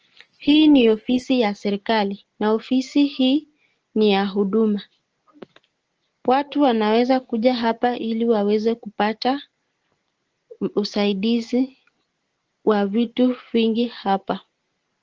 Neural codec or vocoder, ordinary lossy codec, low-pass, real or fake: none; Opus, 16 kbps; 7.2 kHz; real